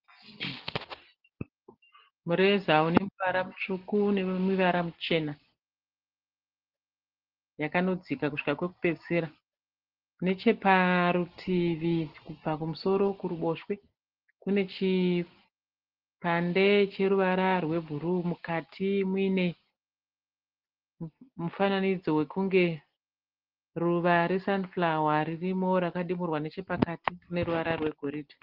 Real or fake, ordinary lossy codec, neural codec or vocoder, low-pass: real; Opus, 16 kbps; none; 5.4 kHz